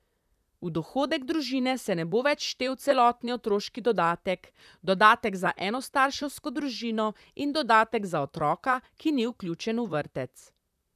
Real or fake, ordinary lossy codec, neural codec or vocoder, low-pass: fake; none; vocoder, 44.1 kHz, 128 mel bands, Pupu-Vocoder; 14.4 kHz